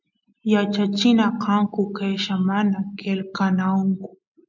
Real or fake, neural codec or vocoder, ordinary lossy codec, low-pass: real; none; MP3, 64 kbps; 7.2 kHz